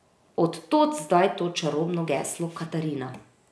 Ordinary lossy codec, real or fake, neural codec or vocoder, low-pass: none; real; none; none